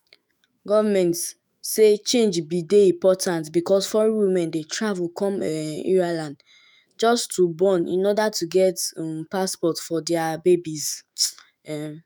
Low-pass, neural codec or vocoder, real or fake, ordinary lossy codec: none; autoencoder, 48 kHz, 128 numbers a frame, DAC-VAE, trained on Japanese speech; fake; none